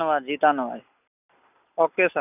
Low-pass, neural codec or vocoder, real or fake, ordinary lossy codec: 3.6 kHz; none; real; none